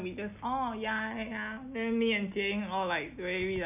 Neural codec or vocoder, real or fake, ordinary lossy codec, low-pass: none; real; none; 3.6 kHz